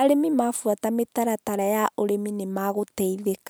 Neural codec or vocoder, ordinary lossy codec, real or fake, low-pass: none; none; real; none